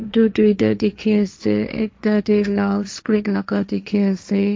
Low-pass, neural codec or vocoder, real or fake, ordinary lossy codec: none; codec, 16 kHz, 1.1 kbps, Voila-Tokenizer; fake; none